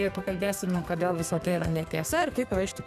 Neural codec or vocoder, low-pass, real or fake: codec, 32 kHz, 1.9 kbps, SNAC; 14.4 kHz; fake